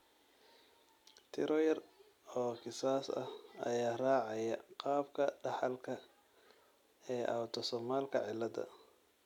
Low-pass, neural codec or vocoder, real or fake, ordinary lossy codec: 19.8 kHz; none; real; none